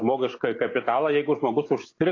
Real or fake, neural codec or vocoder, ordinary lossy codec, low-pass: real; none; AAC, 32 kbps; 7.2 kHz